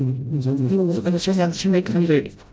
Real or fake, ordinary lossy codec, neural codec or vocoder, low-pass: fake; none; codec, 16 kHz, 0.5 kbps, FreqCodec, smaller model; none